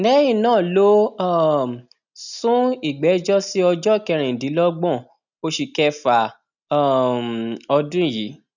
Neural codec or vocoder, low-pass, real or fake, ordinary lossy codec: none; 7.2 kHz; real; none